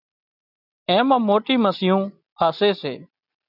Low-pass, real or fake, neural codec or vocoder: 5.4 kHz; real; none